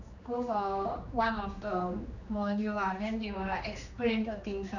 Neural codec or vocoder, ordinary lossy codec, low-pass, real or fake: codec, 16 kHz, 2 kbps, X-Codec, HuBERT features, trained on general audio; none; 7.2 kHz; fake